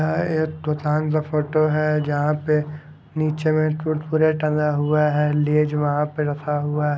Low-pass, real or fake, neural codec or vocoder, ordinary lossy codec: none; real; none; none